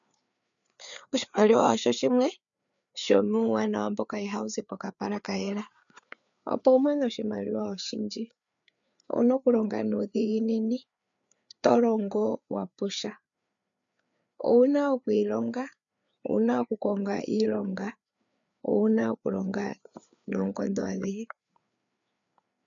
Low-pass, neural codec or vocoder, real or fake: 7.2 kHz; codec, 16 kHz, 4 kbps, FreqCodec, larger model; fake